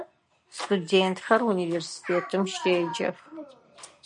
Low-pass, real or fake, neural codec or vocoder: 9.9 kHz; real; none